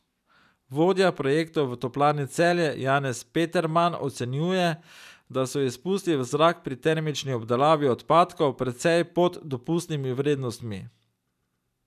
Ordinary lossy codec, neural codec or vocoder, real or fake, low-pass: none; none; real; 14.4 kHz